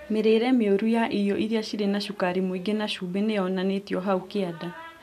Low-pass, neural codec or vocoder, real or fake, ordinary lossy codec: 14.4 kHz; none; real; none